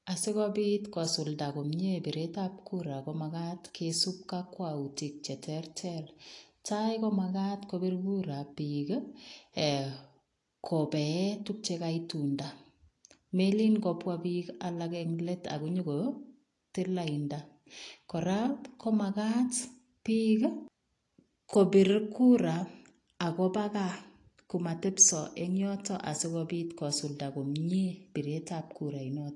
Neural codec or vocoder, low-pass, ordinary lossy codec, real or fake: none; 10.8 kHz; AAC, 48 kbps; real